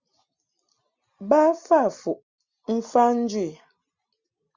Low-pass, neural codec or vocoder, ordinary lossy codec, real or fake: 7.2 kHz; none; Opus, 64 kbps; real